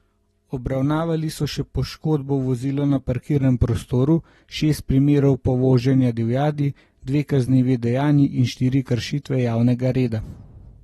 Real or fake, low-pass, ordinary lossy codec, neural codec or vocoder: real; 19.8 kHz; AAC, 32 kbps; none